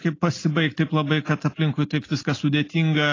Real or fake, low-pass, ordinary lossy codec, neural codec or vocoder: real; 7.2 kHz; AAC, 32 kbps; none